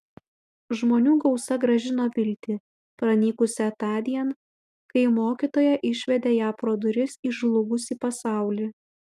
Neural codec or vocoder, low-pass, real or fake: none; 14.4 kHz; real